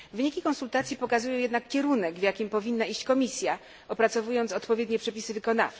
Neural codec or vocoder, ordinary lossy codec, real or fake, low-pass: none; none; real; none